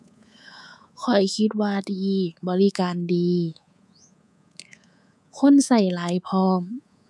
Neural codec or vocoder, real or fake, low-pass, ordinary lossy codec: codec, 24 kHz, 3.1 kbps, DualCodec; fake; none; none